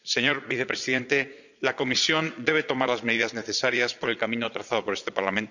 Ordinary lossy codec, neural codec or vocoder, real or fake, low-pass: none; vocoder, 22.05 kHz, 80 mel bands, Vocos; fake; 7.2 kHz